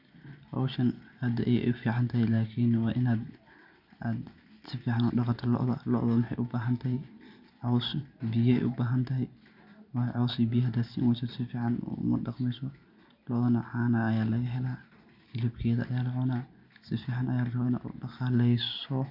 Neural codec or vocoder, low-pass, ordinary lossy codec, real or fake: none; 5.4 kHz; none; real